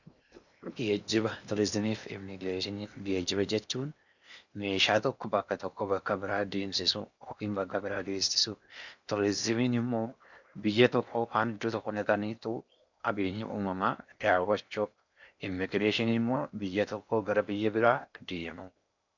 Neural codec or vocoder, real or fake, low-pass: codec, 16 kHz in and 24 kHz out, 0.8 kbps, FocalCodec, streaming, 65536 codes; fake; 7.2 kHz